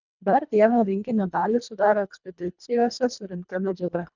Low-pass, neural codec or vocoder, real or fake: 7.2 kHz; codec, 24 kHz, 1.5 kbps, HILCodec; fake